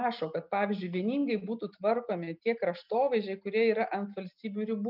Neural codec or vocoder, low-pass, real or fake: none; 5.4 kHz; real